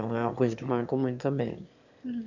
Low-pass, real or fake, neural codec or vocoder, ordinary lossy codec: 7.2 kHz; fake; autoencoder, 22.05 kHz, a latent of 192 numbers a frame, VITS, trained on one speaker; none